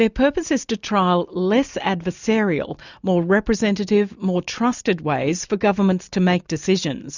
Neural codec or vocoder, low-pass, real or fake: none; 7.2 kHz; real